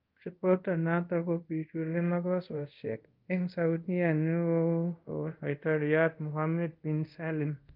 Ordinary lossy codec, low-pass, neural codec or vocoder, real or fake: Opus, 32 kbps; 5.4 kHz; codec, 24 kHz, 0.5 kbps, DualCodec; fake